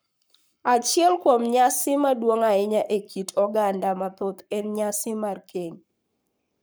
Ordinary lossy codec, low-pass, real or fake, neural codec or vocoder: none; none; fake; codec, 44.1 kHz, 7.8 kbps, Pupu-Codec